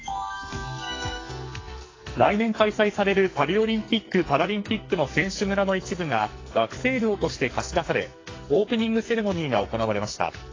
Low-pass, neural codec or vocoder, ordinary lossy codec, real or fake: 7.2 kHz; codec, 44.1 kHz, 2.6 kbps, SNAC; AAC, 32 kbps; fake